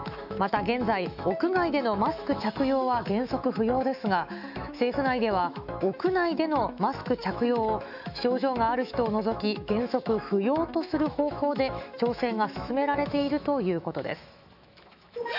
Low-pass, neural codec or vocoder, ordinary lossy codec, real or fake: 5.4 kHz; autoencoder, 48 kHz, 128 numbers a frame, DAC-VAE, trained on Japanese speech; none; fake